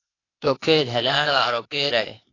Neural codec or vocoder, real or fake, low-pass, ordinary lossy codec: codec, 16 kHz, 0.8 kbps, ZipCodec; fake; 7.2 kHz; AAC, 32 kbps